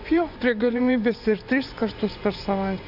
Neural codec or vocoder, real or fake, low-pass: vocoder, 24 kHz, 100 mel bands, Vocos; fake; 5.4 kHz